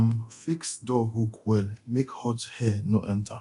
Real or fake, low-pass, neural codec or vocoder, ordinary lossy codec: fake; 10.8 kHz; codec, 24 kHz, 0.9 kbps, DualCodec; none